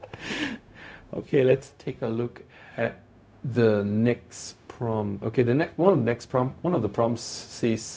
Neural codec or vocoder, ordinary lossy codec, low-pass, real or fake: codec, 16 kHz, 0.4 kbps, LongCat-Audio-Codec; none; none; fake